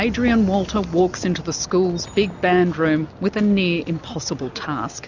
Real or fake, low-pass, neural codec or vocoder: real; 7.2 kHz; none